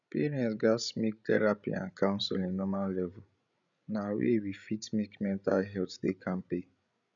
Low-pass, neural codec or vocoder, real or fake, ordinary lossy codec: 7.2 kHz; codec, 16 kHz, 16 kbps, FreqCodec, larger model; fake; none